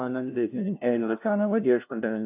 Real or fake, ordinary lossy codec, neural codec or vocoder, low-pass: fake; AAC, 32 kbps; codec, 16 kHz, 0.5 kbps, FunCodec, trained on LibriTTS, 25 frames a second; 3.6 kHz